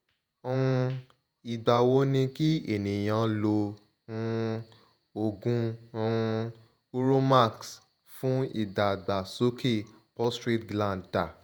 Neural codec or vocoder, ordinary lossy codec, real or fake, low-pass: vocoder, 48 kHz, 128 mel bands, Vocos; none; fake; none